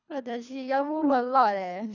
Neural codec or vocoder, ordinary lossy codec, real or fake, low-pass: codec, 24 kHz, 3 kbps, HILCodec; none; fake; 7.2 kHz